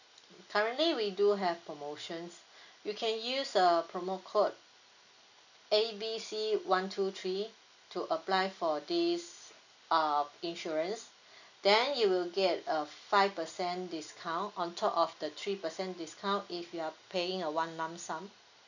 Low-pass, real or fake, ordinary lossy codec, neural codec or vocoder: 7.2 kHz; real; none; none